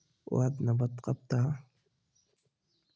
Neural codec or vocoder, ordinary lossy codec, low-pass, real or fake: none; none; none; real